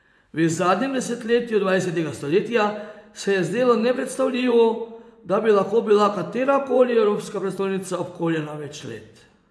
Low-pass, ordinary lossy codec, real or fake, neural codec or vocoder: none; none; fake; vocoder, 24 kHz, 100 mel bands, Vocos